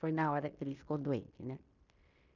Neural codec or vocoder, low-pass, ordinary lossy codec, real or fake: codec, 16 kHz in and 24 kHz out, 0.9 kbps, LongCat-Audio-Codec, fine tuned four codebook decoder; 7.2 kHz; none; fake